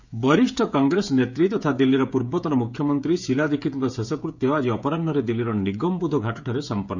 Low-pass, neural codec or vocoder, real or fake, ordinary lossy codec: 7.2 kHz; codec, 16 kHz, 16 kbps, FreqCodec, smaller model; fake; none